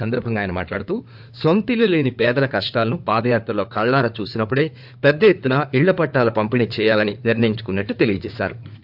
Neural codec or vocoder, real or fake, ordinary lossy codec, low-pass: codec, 16 kHz in and 24 kHz out, 2.2 kbps, FireRedTTS-2 codec; fake; AAC, 48 kbps; 5.4 kHz